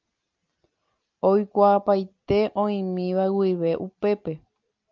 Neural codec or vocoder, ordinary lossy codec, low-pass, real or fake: none; Opus, 24 kbps; 7.2 kHz; real